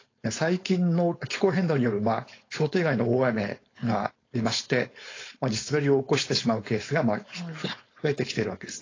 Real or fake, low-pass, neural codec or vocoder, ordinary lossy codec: fake; 7.2 kHz; codec, 16 kHz, 4.8 kbps, FACodec; AAC, 32 kbps